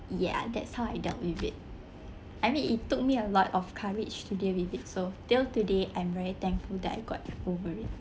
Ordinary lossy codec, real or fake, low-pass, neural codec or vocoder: none; real; none; none